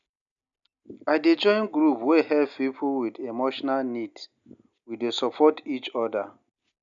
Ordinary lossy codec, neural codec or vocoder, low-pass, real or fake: none; none; 7.2 kHz; real